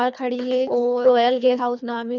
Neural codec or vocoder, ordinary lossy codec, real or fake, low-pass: codec, 24 kHz, 3 kbps, HILCodec; none; fake; 7.2 kHz